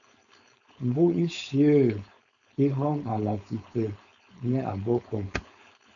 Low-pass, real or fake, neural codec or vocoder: 7.2 kHz; fake; codec, 16 kHz, 4.8 kbps, FACodec